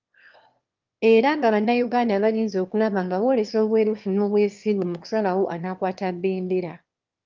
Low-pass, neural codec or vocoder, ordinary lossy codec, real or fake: 7.2 kHz; autoencoder, 22.05 kHz, a latent of 192 numbers a frame, VITS, trained on one speaker; Opus, 24 kbps; fake